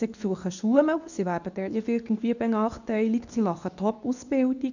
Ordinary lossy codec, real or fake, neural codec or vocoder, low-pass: none; fake; codec, 24 kHz, 0.9 kbps, WavTokenizer, medium speech release version 2; 7.2 kHz